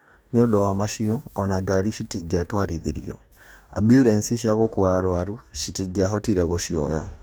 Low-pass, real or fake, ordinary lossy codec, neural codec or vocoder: none; fake; none; codec, 44.1 kHz, 2.6 kbps, DAC